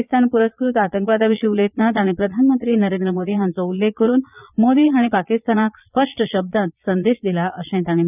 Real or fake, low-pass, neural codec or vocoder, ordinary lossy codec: fake; 3.6 kHz; vocoder, 22.05 kHz, 80 mel bands, Vocos; none